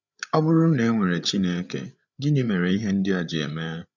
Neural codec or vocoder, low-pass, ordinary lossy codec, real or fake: codec, 16 kHz, 8 kbps, FreqCodec, larger model; 7.2 kHz; none; fake